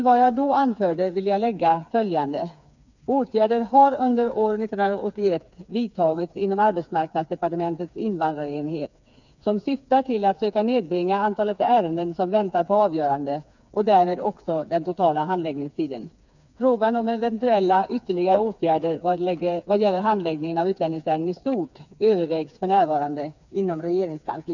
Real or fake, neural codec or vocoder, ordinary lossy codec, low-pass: fake; codec, 16 kHz, 4 kbps, FreqCodec, smaller model; none; 7.2 kHz